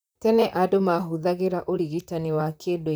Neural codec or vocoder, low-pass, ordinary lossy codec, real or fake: vocoder, 44.1 kHz, 128 mel bands, Pupu-Vocoder; none; none; fake